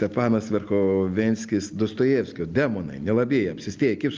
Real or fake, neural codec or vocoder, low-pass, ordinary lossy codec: real; none; 7.2 kHz; Opus, 24 kbps